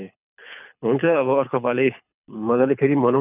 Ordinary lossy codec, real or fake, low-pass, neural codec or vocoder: none; fake; 3.6 kHz; codec, 24 kHz, 6 kbps, HILCodec